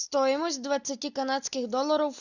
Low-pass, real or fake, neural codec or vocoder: 7.2 kHz; real; none